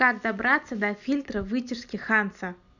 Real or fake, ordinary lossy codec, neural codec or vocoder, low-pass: real; none; none; 7.2 kHz